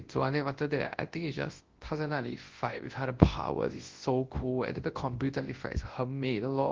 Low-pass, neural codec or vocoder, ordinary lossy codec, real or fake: 7.2 kHz; codec, 24 kHz, 0.9 kbps, WavTokenizer, large speech release; Opus, 16 kbps; fake